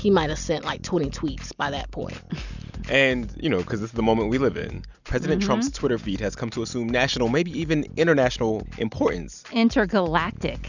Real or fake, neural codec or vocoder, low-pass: real; none; 7.2 kHz